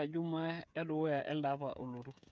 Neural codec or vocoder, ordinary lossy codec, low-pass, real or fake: codec, 16 kHz, 8 kbps, FreqCodec, smaller model; none; none; fake